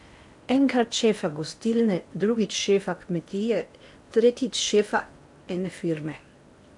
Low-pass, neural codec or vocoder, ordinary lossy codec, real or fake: 10.8 kHz; codec, 16 kHz in and 24 kHz out, 0.8 kbps, FocalCodec, streaming, 65536 codes; AAC, 64 kbps; fake